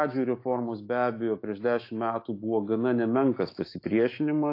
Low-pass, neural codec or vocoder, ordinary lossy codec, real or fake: 5.4 kHz; autoencoder, 48 kHz, 128 numbers a frame, DAC-VAE, trained on Japanese speech; AAC, 32 kbps; fake